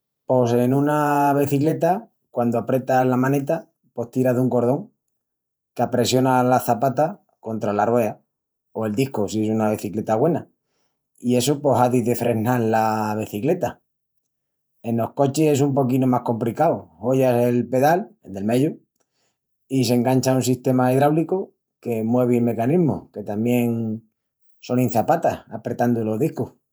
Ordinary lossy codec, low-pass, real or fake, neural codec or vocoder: none; none; fake; vocoder, 48 kHz, 128 mel bands, Vocos